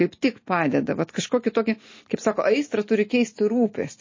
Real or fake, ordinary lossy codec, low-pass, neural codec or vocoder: real; MP3, 32 kbps; 7.2 kHz; none